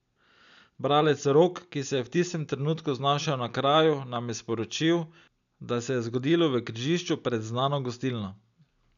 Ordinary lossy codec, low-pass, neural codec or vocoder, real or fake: none; 7.2 kHz; none; real